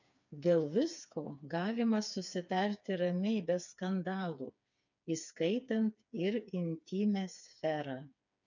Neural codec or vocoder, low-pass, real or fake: codec, 16 kHz, 4 kbps, FreqCodec, smaller model; 7.2 kHz; fake